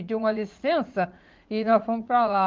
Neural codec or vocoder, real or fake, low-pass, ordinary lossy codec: vocoder, 44.1 kHz, 80 mel bands, Vocos; fake; 7.2 kHz; Opus, 24 kbps